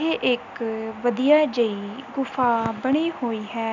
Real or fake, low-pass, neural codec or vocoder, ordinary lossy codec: real; 7.2 kHz; none; none